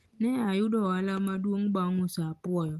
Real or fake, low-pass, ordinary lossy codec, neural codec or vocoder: real; 14.4 kHz; Opus, 24 kbps; none